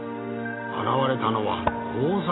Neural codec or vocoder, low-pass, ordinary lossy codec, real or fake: none; 7.2 kHz; AAC, 16 kbps; real